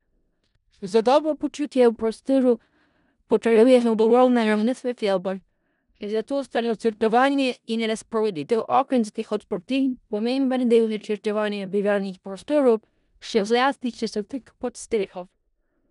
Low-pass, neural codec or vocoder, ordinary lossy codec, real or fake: 10.8 kHz; codec, 16 kHz in and 24 kHz out, 0.4 kbps, LongCat-Audio-Codec, four codebook decoder; none; fake